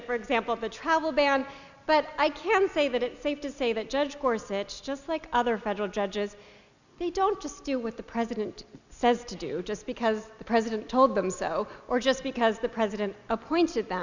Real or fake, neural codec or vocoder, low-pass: real; none; 7.2 kHz